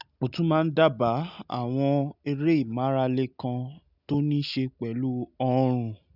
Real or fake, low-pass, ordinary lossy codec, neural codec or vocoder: real; 5.4 kHz; none; none